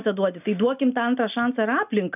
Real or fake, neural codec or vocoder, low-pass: real; none; 3.6 kHz